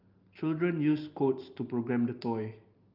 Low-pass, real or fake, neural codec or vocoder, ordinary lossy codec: 5.4 kHz; real; none; Opus, 32 kbps